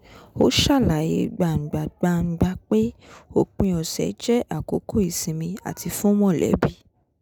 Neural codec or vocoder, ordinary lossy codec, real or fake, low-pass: none; none; real; none